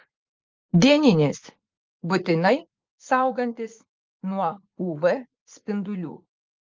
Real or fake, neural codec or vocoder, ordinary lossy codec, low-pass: fake; vocoder, 44.1 kHz, 80 mel bands, Vocos; Opus, 32 kbps; 7.2 kHz